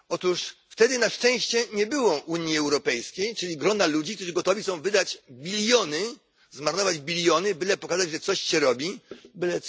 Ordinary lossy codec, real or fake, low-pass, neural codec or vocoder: none; real; none; none